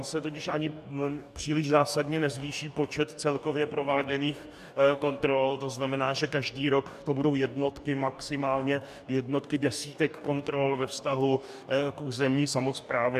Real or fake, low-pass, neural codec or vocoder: fake; 14.4 kHz; codec, 44.1 kHz, 2.6 kbps, DAC